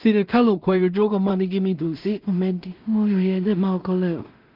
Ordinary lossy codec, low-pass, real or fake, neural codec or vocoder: Opus, 32 kbps; 5.4 kHz; fake; codec, 16 kHz in and 24 kHz out, 0.4 kbps, LongCat-Audio-Codec, two codebook decoder